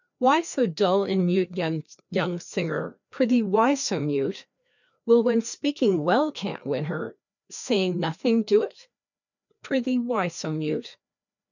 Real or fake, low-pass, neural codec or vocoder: fake; 7.2 kHz; codec, 16 kHz, 2 kbps, FreqCodec, larger model